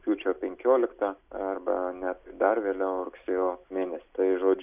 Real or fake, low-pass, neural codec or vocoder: real; 3.6 kHz; none